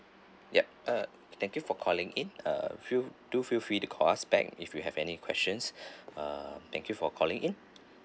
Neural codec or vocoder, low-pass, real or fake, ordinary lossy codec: none; none; real; none